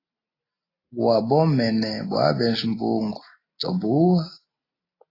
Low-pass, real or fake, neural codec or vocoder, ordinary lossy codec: 5.4 kHz; real; none; AAC, 24 kbps